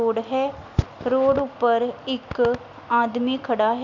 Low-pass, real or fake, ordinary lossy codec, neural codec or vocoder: 7.2 kHz; real; none; none